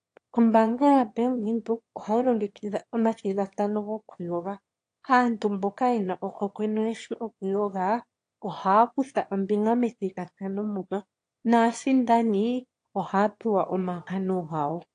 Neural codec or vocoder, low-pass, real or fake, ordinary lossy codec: autoencoder, 22.05 kHz, a latent of 192 numbers a frame, VITS, trained on one speaker; 9.9 kHz; fake; AAC, 48 kbps